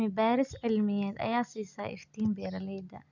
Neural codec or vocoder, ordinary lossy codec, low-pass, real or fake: none; none; 7.2 kHz; real